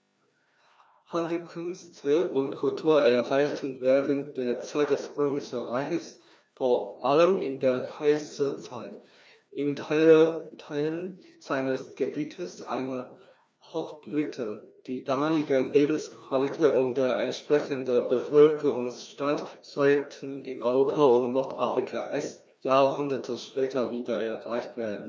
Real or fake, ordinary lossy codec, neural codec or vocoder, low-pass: fake; none; codec, 16 kHz, 1 kbps, FreqCodec, larger model; none